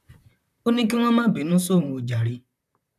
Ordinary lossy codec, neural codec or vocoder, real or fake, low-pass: none; vocoder, 44.1 kHz, 128 mel bands, Pupu-Vocoder; fake; 14.4 kHz